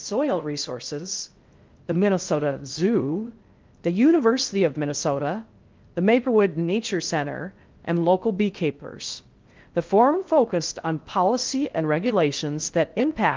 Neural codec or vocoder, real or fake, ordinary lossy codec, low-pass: codec, 16 kHz in and 24 kHz out, 0.6 kbps, FocalCodec, streaming, 2048 codes; fake; Opus, 32 kbps; 7.2 kHz